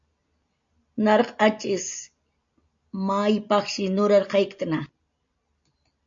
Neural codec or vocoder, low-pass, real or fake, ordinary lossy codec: none; 7.2 kHz; real; MP3, 48 kbps